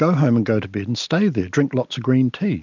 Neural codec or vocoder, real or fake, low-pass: none; real; 7.2 kHz